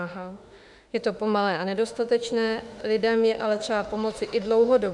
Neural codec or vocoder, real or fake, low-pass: autoencoder, 48 kHz, 32 numbers a frame, DAC-VAE, trained on Japanese speech; fake; 10.8 kHz